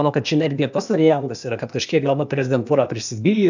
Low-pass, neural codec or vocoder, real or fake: 7.2 kHz; codec, 16 kHz, 0.8 kbps, ZipCodec; fake